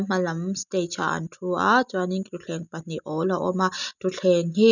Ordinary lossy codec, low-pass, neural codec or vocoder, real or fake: none; 7.2 kHz; none; real